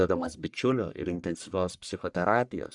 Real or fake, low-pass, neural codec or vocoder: fake; 10.8 kHz; codec, 44.1 kHz, 1.7 kbps, Pupu-Codec